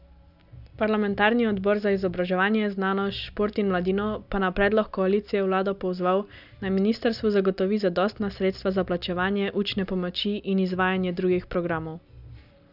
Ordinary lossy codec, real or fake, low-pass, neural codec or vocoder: none; real; 5.4 kHz; none